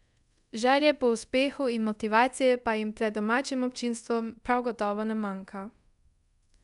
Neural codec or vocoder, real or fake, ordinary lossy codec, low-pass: codec, 24 kHz, 0.5 kbps, DualCodec; fake; none; 10.8 kHz